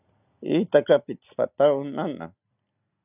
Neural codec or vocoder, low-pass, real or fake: none; 3.6 kHz; real